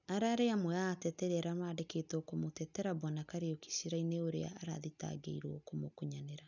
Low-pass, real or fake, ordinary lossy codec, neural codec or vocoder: 7.2 kHz; real; none; none